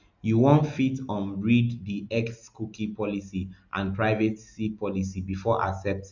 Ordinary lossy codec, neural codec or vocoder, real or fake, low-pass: none; none; real; 7.2 kHz